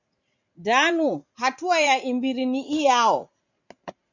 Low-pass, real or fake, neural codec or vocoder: 7.2 kHz; fake; vocoder, 44.1 kHz, 80 mel bands, Vocos